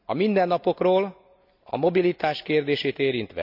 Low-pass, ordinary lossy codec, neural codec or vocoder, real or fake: 5.4 kHz; none; none; real